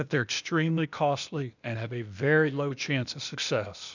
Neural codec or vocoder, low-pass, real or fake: codec, 16 kHz, 0.8 kbps, ZipCodec; 7.2 kHz; fake